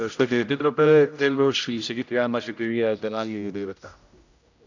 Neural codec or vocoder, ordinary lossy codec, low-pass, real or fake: codec, 16 kHz, 0.5 kbps, X-Codec, HuBERT features, trained on general audio; none; 7.2 kHz; fake